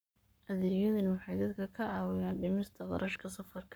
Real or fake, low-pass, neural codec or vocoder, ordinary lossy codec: fake; none; codec, 44.1 kHz, 7.8 kbps, Pupu-Codec; none